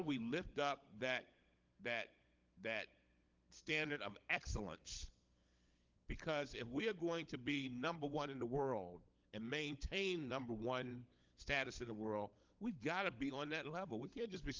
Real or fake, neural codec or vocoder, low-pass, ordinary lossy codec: fake; codec, 16 kHz, 16 kbps, FunCodec, trained on LibriTTS, 50 frames a second; 7.2 kHz; Opus, 32 kbps